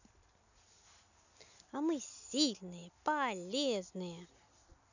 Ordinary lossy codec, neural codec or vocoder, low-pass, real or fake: none; none; 7.2 kHz; real